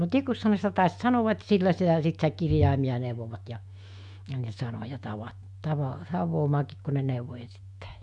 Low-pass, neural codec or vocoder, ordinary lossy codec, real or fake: 10.8 kHz; autoencoder, 48 kHz, 128 numbers a frame, DAC-VAE, trained on Japanese speech; Opus, 64 kbps; fake